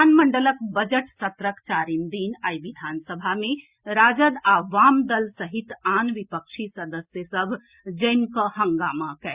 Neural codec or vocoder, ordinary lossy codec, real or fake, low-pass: none; Opus, 64 kbps; real; 3.6 kHz